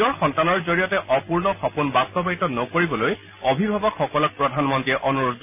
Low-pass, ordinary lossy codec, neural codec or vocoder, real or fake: 3.6 kHz; none; none; real